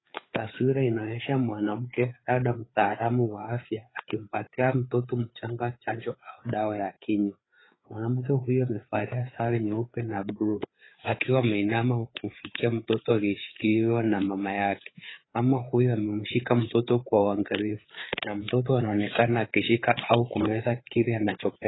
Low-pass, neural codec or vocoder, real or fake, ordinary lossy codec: 7.2 kHz; codec, 16 kHz, 4 kbps, FreqCodec, larger model; fake; AAC, 16 kbps